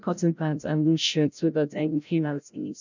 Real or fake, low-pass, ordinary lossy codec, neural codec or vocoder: fake; 7.2 kHz; none; codec, 16 kHz, 0.5 kbps, FreqCodec, larger model